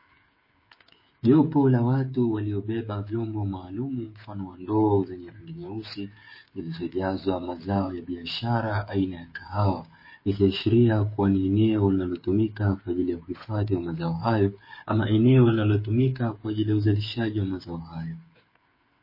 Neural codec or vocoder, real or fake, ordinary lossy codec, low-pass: codec, 16 kHz, 8 kbps, FreqCodec, smaller model; fake; MP3, 24 kbps; 5.4 kHz